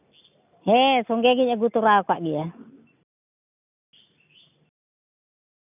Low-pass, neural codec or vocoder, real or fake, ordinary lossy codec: 3.6 kHz; none; real; none